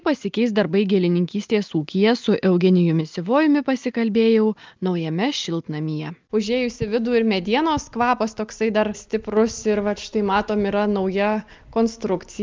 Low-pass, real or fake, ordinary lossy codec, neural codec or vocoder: 7.2 kHz; real; Opus, 24 kbps; none